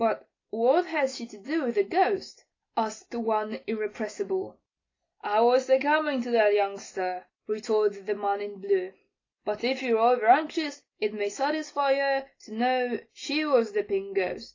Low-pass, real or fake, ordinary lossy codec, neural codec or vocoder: 7.2 kHz; real; AAC, 32 kbps; none